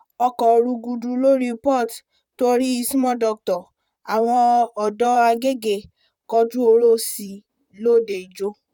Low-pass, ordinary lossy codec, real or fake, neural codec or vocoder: 19.8 kHz; none; fake; vocoder, 44.1 kHz, 128 mel bands, Pupu-Vocoder